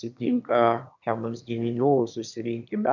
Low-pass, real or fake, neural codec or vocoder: 7.2 kHz; fake; autoencoder, 22.05 kHz, a latent of 192 numbers a frame, VITS, trained on one speaker